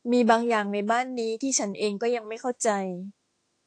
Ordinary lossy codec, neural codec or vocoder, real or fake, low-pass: AAC, 48 kbps; autoencoder, 48 kHz, 32 numbers a frame, DAC-VAE, trained on Japanese speech; fake; 9.9 kHz